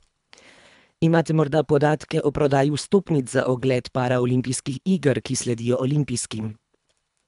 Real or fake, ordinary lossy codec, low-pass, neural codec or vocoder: fake; none; 10.8 kHz; codec, 24 kHz, 3 kbps, HILCodec